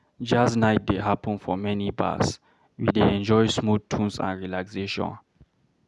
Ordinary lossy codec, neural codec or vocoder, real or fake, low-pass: none; none; real; none